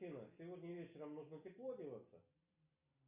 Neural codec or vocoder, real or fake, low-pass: none; real; 3.6 kHz